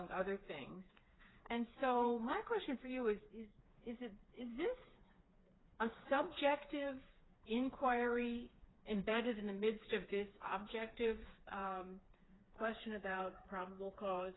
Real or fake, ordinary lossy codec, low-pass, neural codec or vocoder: fake; AAC, 16 kbps; 7.2 kHz; codec, 16 kHz, 4 kbps, FreqCodec, smaller model